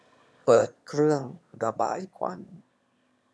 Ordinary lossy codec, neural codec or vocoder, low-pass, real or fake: none; autoencoder, 22.05 kHz, a latent of 192 numbers a frame, VITS, trained on one speaker; none; fake